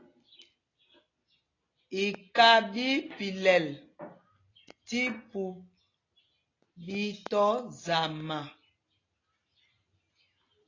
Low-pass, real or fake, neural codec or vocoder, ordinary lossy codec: 7.2 kHz; real; none; AAC, 32 kbps